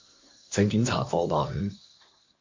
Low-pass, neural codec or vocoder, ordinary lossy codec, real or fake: 7.2 kHz; codec, 24 kHz, 1 kbps, SNAC; MP3, 48 kbps; fake